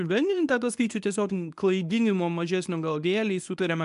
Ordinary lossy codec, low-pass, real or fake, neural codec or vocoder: MP3, 96 kbps; 10.8 kHz; fake; codec, 24 kHz, 0.9 kbps, WavTokenizer, medium speech release version 1